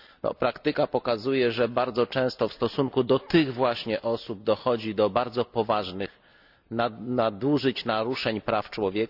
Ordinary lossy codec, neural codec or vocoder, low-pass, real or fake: none; none; 5.4 kHz; real